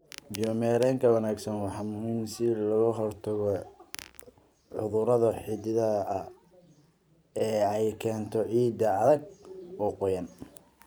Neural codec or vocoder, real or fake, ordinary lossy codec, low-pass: vocoder, 44.1 kHz, 128 mel bands every 512 samples, BigVGAN v2; fake; none; none